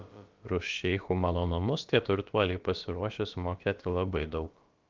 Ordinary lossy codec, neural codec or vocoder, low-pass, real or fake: Opus, 32 kbps; codec, 16 kHz, about 1 kbps, DyCAST, with the encoder's durations; 7.2 kHz; fake